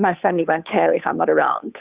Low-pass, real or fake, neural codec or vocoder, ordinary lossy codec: 3.6 kHz; fake; codec, 16 kHz, 2 kbps, FunCodec, trained on Chinese and English, 25 frames a second; Opus, 64 kbps